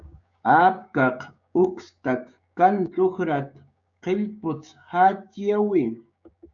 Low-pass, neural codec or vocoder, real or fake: 7.2 kHz; codec, 16 kHz, 6 kbps, DAC; fake